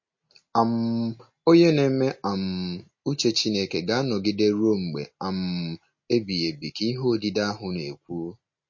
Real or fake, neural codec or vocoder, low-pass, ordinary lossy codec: real; none; 7.2 kHz; MP3, 32 kbps